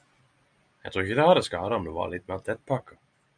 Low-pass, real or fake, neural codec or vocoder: 9.9 kHz; fake; vocoder, 44.1 kHz, 128 mel bands every 256 samples, BigVGAN v2